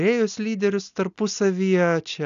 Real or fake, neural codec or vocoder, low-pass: real; none; 7.2 kHz